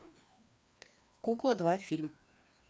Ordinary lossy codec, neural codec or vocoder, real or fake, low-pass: none; codec, 16 kHz, 2 kbps, FreqCodec, larger model; fake; none